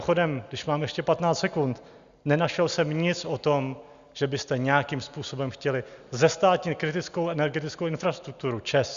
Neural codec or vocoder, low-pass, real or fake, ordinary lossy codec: none; 7.2 kHz; real; Opus, 64 kbps